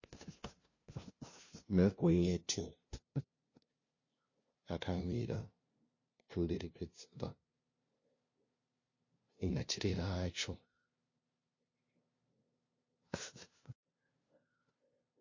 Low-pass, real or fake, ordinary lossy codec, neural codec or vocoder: 7.2 kHz; fake; MP3, 32 kbps; codec, 16 kHz, 0.5 kbps, FunCodec, trained on LibriTTS, 25 frames a second